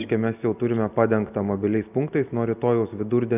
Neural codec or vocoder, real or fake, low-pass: none; real; 3.6 kHz